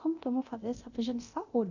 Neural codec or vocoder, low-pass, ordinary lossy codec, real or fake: codec, 24 kHz, 0.9 kbps, DualCodec; 7.2 kHz; none; fake